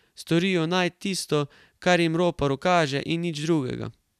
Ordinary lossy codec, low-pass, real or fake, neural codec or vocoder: none; 14.4 kHz; real; none